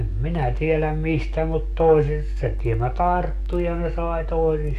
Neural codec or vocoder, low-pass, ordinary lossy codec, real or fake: codec, 44.1 kHz, 7.8 kbps, DAC; 14.4 kHz; none; fake